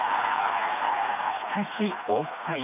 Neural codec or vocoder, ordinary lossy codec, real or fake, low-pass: codec, 16 kHz, 2 kbps, FreqCodec, smaller model; none; fake; 3.6 kHz